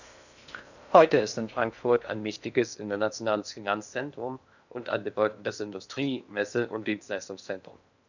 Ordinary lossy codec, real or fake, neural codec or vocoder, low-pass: none; fake; codec, 16 kHz in and 24 kHz out, 0.6 kbps, FocalCodec, streaming, 4096 codes; 7.2 kHz